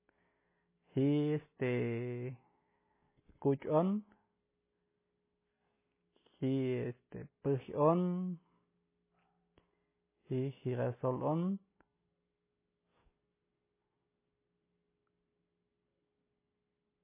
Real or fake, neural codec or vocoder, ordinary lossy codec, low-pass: real; none; MP3, 16 kbps; 3.6 kHz